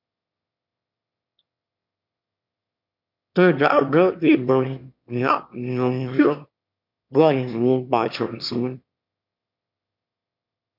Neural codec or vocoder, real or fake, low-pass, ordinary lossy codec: autoencoder, 22.05 kHz, a latent of 192 numbers a frame, VITS, trained on one speaker; fake; 5.4 kHz; MP3, 48 kbps